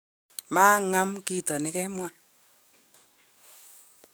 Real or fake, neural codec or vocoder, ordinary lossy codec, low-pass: fake; codec, 44.1 kHz, 7.8 kbps, DAC; none; none